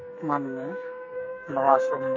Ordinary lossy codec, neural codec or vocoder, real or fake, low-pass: MP3, 32 kbps; codec, 44.1 kHz, 2.6 kbps, SNAC; fake; 7.2 kHz